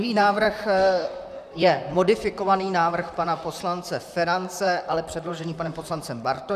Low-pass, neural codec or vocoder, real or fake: 14.4 kHz; vocoder, 44.1 kHz, 128 mel bands, Pupu-Vocoder; fake